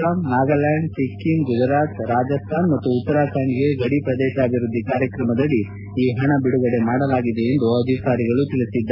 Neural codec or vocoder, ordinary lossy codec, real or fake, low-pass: none; MP3, 32 kbps; real; 5.4 kHz